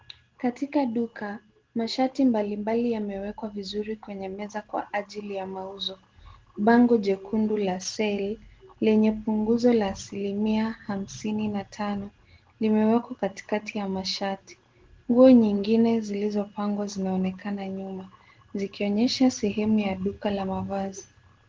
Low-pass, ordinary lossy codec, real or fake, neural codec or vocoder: 7.2 kHz; Opus, 16 kbps; real; none